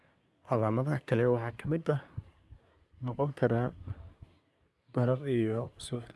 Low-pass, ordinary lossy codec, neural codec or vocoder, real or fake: none; none; codec, 24 kHz, 1 kbps, SNAC; fake